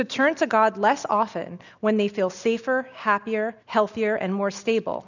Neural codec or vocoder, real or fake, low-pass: none; real; 7.2 kHz